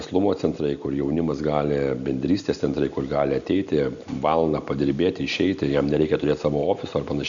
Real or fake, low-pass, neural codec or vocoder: real; 7.2 kHz; none